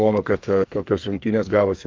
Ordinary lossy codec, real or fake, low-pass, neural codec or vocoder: Opus, 24 kbps; fake; 7.2 kHz; codec, 44.1 kHz, 2.6 kbps, SNAC